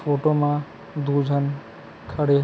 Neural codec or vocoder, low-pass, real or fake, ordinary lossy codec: none; none; real; none